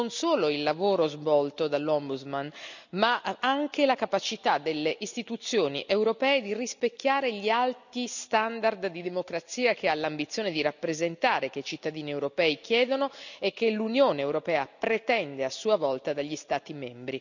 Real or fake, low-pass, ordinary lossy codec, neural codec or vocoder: real; 7.2 kHz; none; none